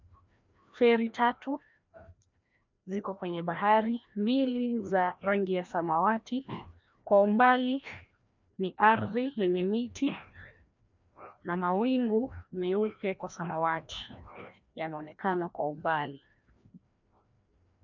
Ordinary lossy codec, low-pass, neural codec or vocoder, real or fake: MP3, 64 kbps; 7.2 kHz; codec, 16 kHz, 1 kbps, FreqCodec, larger model; fake